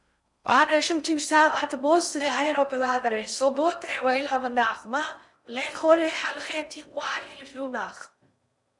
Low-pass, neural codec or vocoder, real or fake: 10.8 kHz; codec, 16 kHz in and 24 kHz out, 0.6 kbps, FocalCodec, streaming, 4096 codes; fake